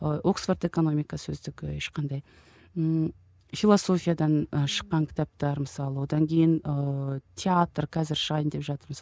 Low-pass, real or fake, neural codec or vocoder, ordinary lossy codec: none; real; none; none